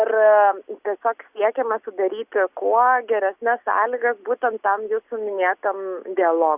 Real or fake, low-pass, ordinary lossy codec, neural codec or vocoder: real; 3.6 kHz; Opus, 64 kbps; none